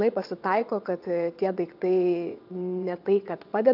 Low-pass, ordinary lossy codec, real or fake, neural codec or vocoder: 5.4 kHz; AAC, 48 kbps; fake; vocoder, 22.05 kHz, 80 mel bands, WaveNeXt